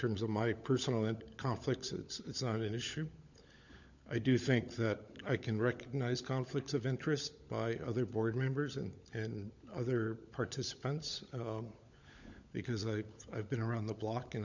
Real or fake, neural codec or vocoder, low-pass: fake; codec, 16 kHz, 16 kbps, FunCodec, trained on LibriTTS, 50 frames a second; 7.2 kHz